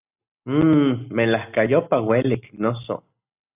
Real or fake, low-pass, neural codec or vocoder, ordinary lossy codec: real; 3.6 kHz; none; AAC, 32 kbps